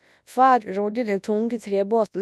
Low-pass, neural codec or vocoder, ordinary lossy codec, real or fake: none; codec, 24 kHz, 0.9 kbps, WavTokenizer, large speech release; none; fake